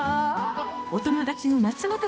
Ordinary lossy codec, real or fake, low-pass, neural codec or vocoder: none; fake; none; codec, 16 kHz, 1 kbps, X-Codec, HuBERT features, trained on balanced general audio